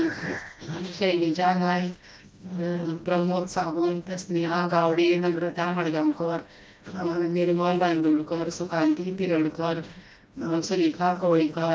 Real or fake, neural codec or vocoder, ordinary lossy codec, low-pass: fake; codec, 16 kHz, 1 kbps, FreqCodec, smaller model; none; none